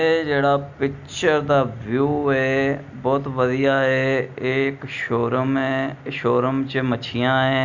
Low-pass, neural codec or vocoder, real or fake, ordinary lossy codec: 7.2 kHz; none; real; none